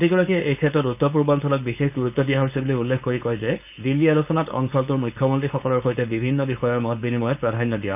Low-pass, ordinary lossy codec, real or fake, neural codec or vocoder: 3.6 kHz; none; fake; codec, 16 kHz, 4.8 kbps, FACodec